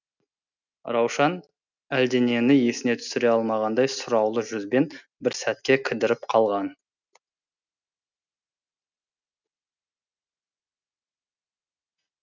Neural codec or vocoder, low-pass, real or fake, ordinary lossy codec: none; 7.2 kHz; real; none